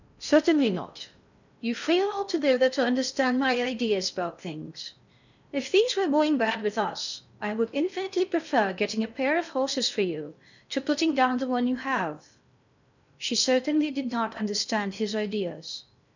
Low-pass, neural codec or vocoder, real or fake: 7.2 kHz; codec, 16 kHz in and 24 kHz out, 0.6 kbps, FocalCodec, streaming, 4096 codes; fake